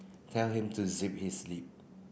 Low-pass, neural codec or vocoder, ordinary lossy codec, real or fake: none; none; none; real